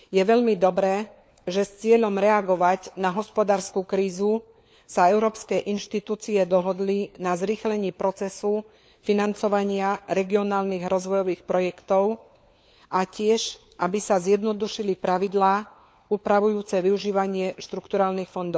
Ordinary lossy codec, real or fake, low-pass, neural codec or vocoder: none; fake; none; codec, 16 kHz, 4 kbps, FunCodec, trained on LibriTTS, 50 frames a second